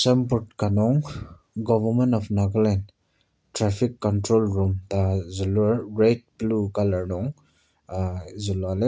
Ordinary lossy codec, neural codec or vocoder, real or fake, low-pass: none; none; real; none